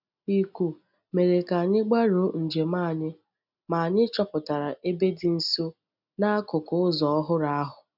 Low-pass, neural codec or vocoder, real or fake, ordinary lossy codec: 5.4 kHz; none; real; none